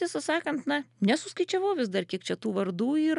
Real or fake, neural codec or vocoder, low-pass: real; none; 10.8 kHz